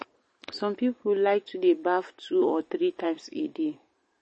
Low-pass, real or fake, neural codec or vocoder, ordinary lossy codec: 10.8 kHz; fake; vocoder, 44.1 kHz, 128 mel bands every 512 samples, BigVGAN v2; MP3, 32 kbps